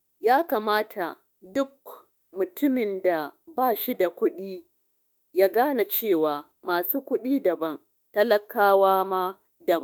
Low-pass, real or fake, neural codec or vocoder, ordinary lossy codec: none; fake; autoencoder, 48 kHz, 32 numbers a frame, DAC-VAE, trained on Japanese speech; none